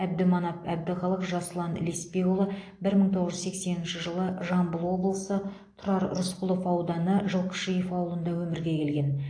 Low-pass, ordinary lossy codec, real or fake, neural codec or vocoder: 9.9 kHz; AAC, 48 kbps; real; none